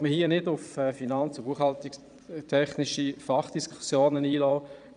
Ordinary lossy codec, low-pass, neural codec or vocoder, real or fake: none; 9.9 kHz; vocoder, 22.05 kHz, 80 mel bands, Vocos; fake